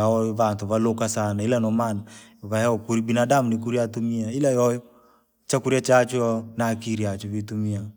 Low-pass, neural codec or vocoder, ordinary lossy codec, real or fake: none; none; none; real